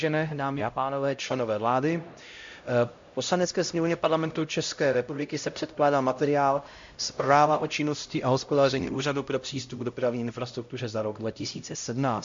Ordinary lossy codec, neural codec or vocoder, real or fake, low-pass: MP3, 48 kbps; codec, 16 kHz, 0.5 kbps, X-Codec, HuBERT features, trained on LibriSpeech; fake; 7.2 kHz